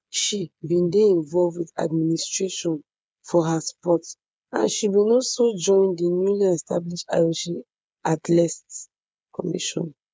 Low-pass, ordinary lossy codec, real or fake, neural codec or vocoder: none; none; fake; codec, 16 kHz, 8 kbps, FreqCodec, smaller model